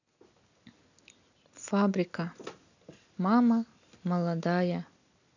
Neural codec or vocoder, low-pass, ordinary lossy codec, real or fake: none; 7.2 kHz; none; real